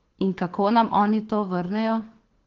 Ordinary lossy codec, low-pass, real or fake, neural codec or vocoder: Opus, 16 kbps; 7.2 kHz; fake; codec, 24 kHz, 6 kbps, HILCodec